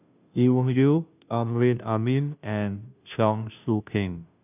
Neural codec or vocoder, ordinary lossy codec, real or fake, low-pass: codec, 16 kHz, 0.5 kbps, FunCodec, trained on Chinese and English, 25 frames a second; none; fake; 3.6 kHz